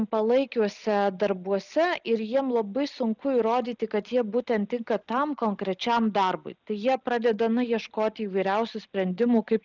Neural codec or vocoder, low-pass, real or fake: none; 7.2 kHz; real